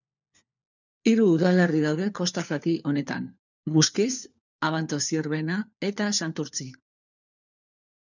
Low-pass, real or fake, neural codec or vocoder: 7.2 kHz; fake; codec, 16 kHz, 4 kbps, FunCodec, trained on LibriTTS, 50 frames a second